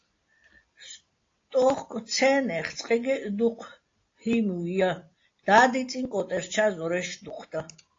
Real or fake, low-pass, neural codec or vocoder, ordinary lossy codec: real; 7.2 kHz; none; AAC, 32 kbps